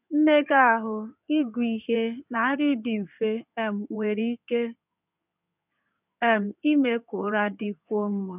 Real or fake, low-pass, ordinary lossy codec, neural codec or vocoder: fake; 3.6 kHz; none; codec, 16 kHz in and 24 kHz out, 2.2 kbps, FireRedTTS-2 codec